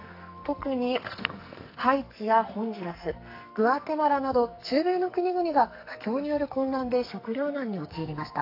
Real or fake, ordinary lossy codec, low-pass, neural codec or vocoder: fake; AAC, 32 kbps; 5.4 kHz; codec, 44.1 kHz, 2.6 kbps, SNAC